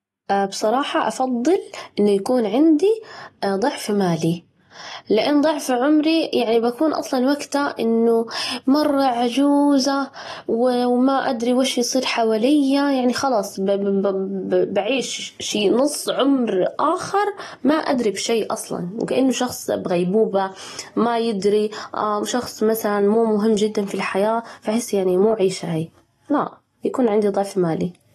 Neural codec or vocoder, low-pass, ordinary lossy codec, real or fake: none; 19.8 kHz; AAC, 32 kbps; real